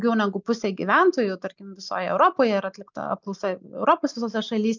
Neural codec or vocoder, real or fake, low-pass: none; real; 7.2 kHz